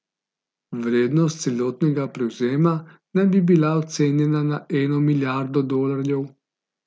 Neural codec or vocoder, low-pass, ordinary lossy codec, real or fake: none; none; none; real